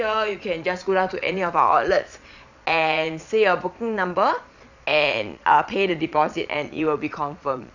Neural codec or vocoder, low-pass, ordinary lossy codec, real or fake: vocoder, 22.05 kHz, 80 mel bands, Vocos; 7.2 kHz; none; fake